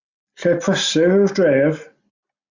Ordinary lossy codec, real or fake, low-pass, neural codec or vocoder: Opus, 64 kbps; real; 7.2 kHz; none